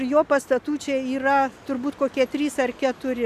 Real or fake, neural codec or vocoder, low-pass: real; none; 14.4 kHz